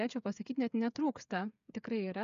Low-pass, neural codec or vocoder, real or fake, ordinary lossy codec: 7.2 kHz; codec, 16 kHz, 8 kbps, FreqCodec, smaller model; fake; AAC, 96 kbps